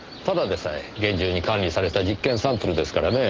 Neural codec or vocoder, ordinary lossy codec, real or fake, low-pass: none; Opus, 16 kbps; real; 7.2 kHz